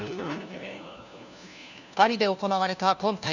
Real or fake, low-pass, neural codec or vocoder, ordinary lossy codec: fake; 7.2 kHz; codec, 16 kHz, 1 kbps, FunCodec, trained on LibriTTS, 50 frames a second; none